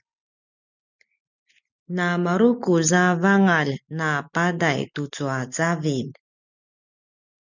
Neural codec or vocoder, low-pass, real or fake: none; 7.2 kHz; real